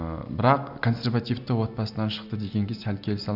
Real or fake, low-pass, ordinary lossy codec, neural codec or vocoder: real; 5.4 kHz; none; none